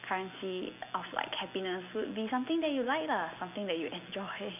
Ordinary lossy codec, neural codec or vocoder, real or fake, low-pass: none; none; real; 3.6 kHz